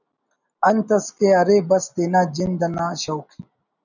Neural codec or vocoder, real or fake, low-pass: none; real; 7.2 kHz